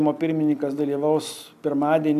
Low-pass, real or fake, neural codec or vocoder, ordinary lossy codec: 14.4 kHz; real; none; AAC, 96 kbps